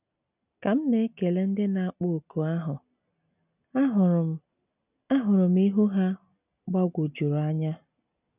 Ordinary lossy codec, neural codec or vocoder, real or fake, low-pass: none; none; real; 3.6 kHz